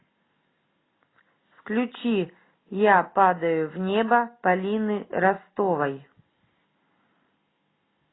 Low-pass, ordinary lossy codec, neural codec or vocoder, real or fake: 7.2 kHz; AAC, 16 kbps; none; real